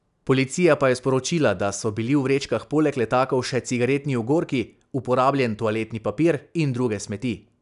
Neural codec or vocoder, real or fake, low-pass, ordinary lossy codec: none; real; 10.8 kHz; none